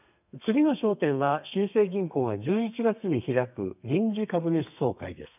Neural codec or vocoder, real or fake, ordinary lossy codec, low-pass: codec, 32 kHz, 1.9 kbps, SNAC; fake; none; 3.6 kHz